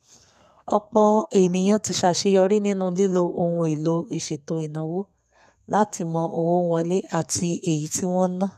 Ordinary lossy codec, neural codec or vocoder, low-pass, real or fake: none; codec, 32 kHz, 1.9 kbps, SNAC; 14.4 kHz; fake